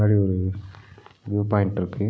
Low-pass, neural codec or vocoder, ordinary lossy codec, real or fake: none; none; none; real